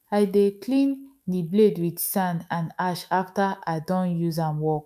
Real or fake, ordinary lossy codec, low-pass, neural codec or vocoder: fake; none; 14.4 kHz; autoencoder, 48 kHz, 128 numbers a frame, DAC-VAE, trained on Japanese speech